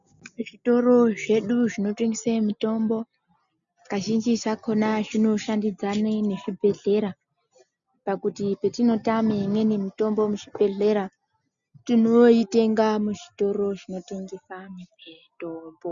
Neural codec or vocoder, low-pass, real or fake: none; 7.2 kHz; real